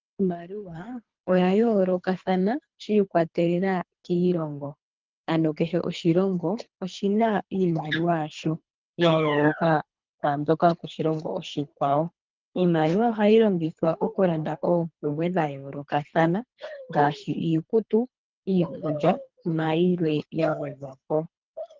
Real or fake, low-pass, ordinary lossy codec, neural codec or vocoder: fake; 7.2 kHz; Opus, 24 kbps; codec, 24 kHz, 3 kbps, HILCodec